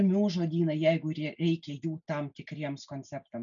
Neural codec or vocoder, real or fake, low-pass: none; real; 7.2 kHz